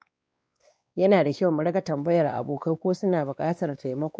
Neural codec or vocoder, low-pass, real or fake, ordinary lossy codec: codec, 16 kHz, 2 kbps, X-Codec, WavLM features, trained on Multilingual LibriSpeech; none; fake; none